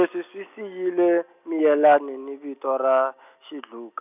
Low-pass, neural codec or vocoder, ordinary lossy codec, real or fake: 3.6 kHz; none; none; real